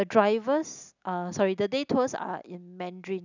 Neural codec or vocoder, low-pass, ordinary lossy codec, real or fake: none; 7.2 kHz; none; real